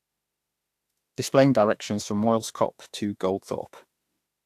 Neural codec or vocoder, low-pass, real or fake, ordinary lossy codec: autoencoder, 48 kHz, 32 numbers a frame, DAC-VAE, trained on Japanese speech; 14.4 kHz; fake; AAC, 64 kbps